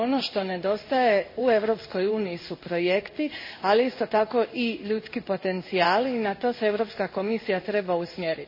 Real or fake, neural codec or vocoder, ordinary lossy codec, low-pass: fake; codec, 16 kHz in and 24 kHz out, 1 kbps, XY-Tokenizer; MP3, 24 kbps; 5.4 kHz